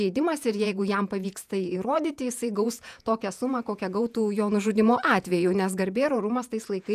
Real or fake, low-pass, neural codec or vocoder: fake; 14.4 kHz; vocoder, 48 kHz, 128 mel bands, Vocos